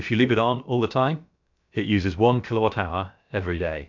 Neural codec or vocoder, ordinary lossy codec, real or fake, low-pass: codec, 16 kHz, about 1 kbps, DyCAST, with the encoder's durations; MP3, 64 kbps; fake; 7.2 kHz